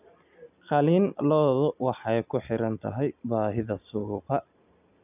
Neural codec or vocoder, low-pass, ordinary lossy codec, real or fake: none; 3.6 kHz; none; real